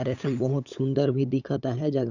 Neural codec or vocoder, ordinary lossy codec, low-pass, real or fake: codec, 16 kHz, 8 kbps, FunCodec, trained on LibriTTS, 25 frames a second; none; 7.2 kHz; fake